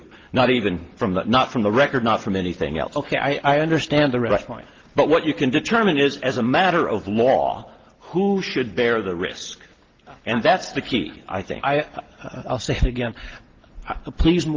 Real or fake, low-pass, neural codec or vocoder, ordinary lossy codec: real; 7.2 kHz; none; Opus, 16 kbps